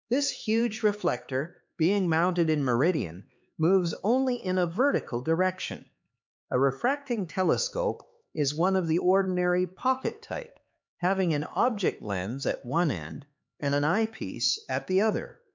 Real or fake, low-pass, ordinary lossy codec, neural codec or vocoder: fake; 7.2 kHz; MP3, 64 kbps; codec, 16 kHz, 4 kbps, X-Codec, HuBERT features, trained on LibriSpeech